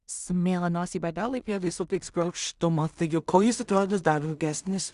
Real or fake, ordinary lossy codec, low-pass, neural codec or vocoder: fake; Opus, 64 kbps; 10.8 kHz; codec, 16 kHz in and 24 kHz out, 0.4 kbps, LongCat-Audio-Codec, two codebook decoder